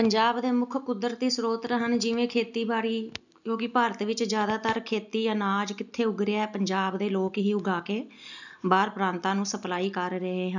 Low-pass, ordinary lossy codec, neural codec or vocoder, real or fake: 7.2 kHz; none; none; real